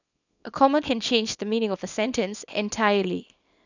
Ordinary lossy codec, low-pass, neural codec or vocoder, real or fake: none; 7.2 kHz; codec, 24 kHz, 0.9 kbps, WavTokenizer, small release; fake